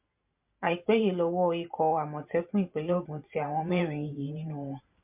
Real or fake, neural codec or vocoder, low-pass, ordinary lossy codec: fake; vocoder, 44.1 kHz, 128 mel bands every 512 samples, BigVGAN v2; 3.6 kHz; MP3, 32 kbps